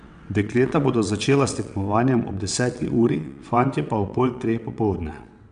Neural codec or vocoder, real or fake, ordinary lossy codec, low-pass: vocoder, 22.05 kHz, 80 mel bands, WaveNeXt; fake; none; 9.9 kHz